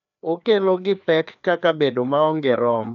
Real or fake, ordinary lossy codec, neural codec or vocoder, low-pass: fake; none; codec, 16 kHz, 2 kbps, FreqCodec, larger model; 7.2 kHz